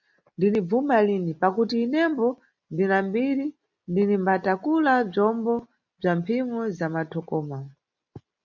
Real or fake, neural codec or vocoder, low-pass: real; none; 7.2 kHz